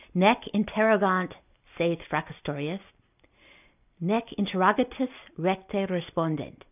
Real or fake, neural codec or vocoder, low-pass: real; none; 3.6 kHz